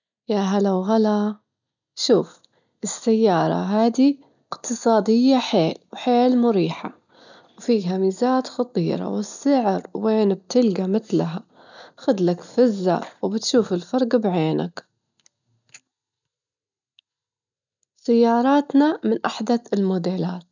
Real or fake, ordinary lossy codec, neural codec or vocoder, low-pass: real; none; none; 7.2 kHz